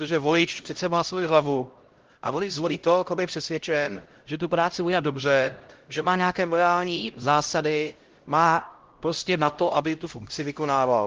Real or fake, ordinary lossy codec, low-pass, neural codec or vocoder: fake; Opus, 16 kbps; 7.2 kHz; codec, 16 kHz, 0.5 kbps, X-Codec, HuBERT features, trained on LibriSpeech